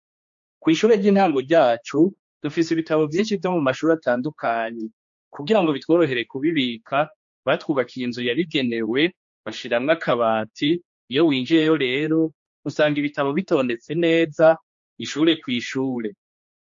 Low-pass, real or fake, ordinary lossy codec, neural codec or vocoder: 7.2 kHz; fake; MP3, 48 kbps; codec, 16 kHz, 2 kbps, X-Codec, HuBERT features, trained on general audio